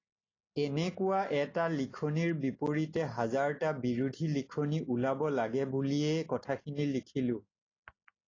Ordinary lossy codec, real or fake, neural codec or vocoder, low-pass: AAC, 32 kbps; real; none; 7.2 kHz